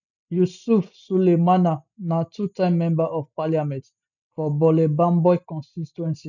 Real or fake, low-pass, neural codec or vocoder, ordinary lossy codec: real; 7.2 kHz; none; none